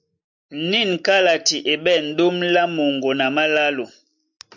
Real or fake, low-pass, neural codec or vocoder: real; 7.2 kHz; none